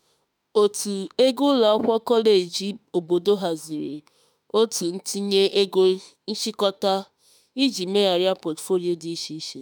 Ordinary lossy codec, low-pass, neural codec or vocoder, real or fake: none; none; autoencoder, 48 kHz, 32 numbers a frame, DAC-VAE, trained on Japanese speech; fake